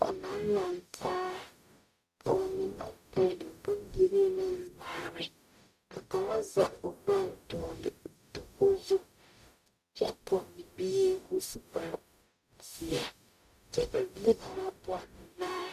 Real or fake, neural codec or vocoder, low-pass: fake; codec, 44.1 kHz, 0.9 kbps, DAC; 14.4 kHz